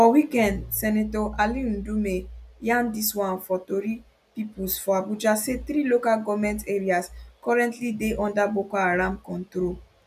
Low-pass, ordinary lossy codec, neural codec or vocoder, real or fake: 14.4 kHz; none; none; real